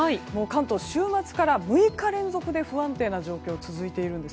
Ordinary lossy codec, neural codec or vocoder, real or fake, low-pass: none; none; real; none